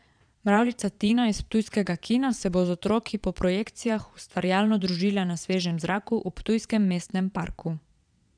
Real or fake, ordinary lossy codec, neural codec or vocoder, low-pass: fake; none; vocoder, 22.05 kHz, 80 mel bands, Vocos; 9.9 kHz